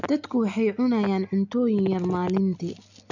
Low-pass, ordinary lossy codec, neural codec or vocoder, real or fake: 7.2 kHz; none; none; real